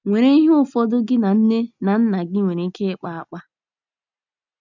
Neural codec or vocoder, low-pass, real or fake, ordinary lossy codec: none; 7.2 kHz; real; none